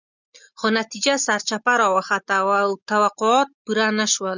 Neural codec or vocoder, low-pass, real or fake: none; 7.2 kHz; real